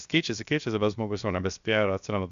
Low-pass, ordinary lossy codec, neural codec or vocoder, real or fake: 7.2 kHz; Opus, 64 kbps; codec, 16 kHz, 0.7 kbps, FocalCodec; fake